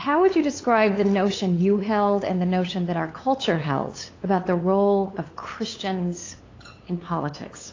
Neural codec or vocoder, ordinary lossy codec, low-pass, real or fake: codec, 16 kHz, 8 kbps, FunCodec, trained on LibriTTS, 25 frames a second; AAC, 32 kbps; 7.2 kHz; fake